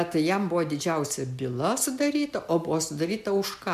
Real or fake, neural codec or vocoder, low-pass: real; none; 14.4 kHz